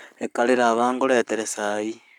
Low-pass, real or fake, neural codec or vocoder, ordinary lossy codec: 19.8 kHz; fake; codec, 44.1 kHz, 7.8 kbps, Pupu-Codec; none